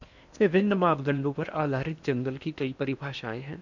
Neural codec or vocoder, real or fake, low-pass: codec, 16 kHz in and 24 kHz out, 0.8 kbps, FocalCodec, streaming, 65536 codes; fake; 7.2 kHz